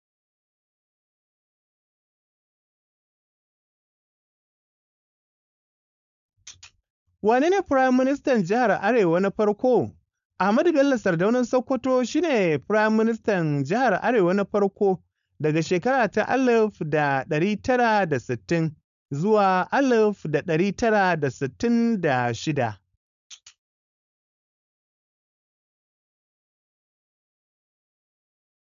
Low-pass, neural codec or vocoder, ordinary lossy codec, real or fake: 7.2 kHz; codec, 16 kHz, 4.8 kbps, FACodec; none; fake